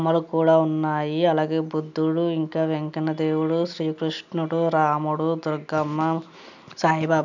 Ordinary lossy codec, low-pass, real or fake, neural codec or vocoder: none; 7.2 kHz; real; none